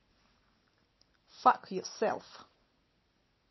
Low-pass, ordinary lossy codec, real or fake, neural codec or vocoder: 7.2 kHz; MP3, 24 kbps; real; none